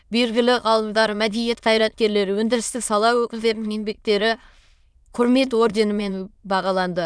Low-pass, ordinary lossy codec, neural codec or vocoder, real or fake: none; none; autoencoder, 22.05 kHz, a latent of 192 numbers a frame, VITS, trained on many speakers; fake